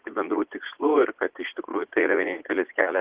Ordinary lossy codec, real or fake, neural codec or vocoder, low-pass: Opus, 16 kbps; fake; vocoder, 44.1 kHz, 80 mel bands, Vocos; 3.6 kHz